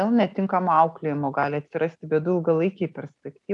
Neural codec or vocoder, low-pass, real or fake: none; 10.8 kHz; real